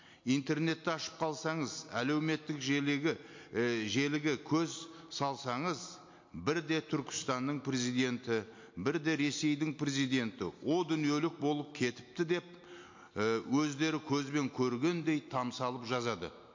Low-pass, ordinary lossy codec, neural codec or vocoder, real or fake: 7.2 kHz; MP3, 48 kbps; none; real